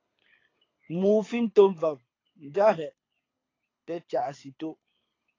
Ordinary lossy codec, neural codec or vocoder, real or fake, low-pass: AAC, 32 kbps; codec, 24 kHz, 6 kbps, HILCodec; fake; 7.2 kHz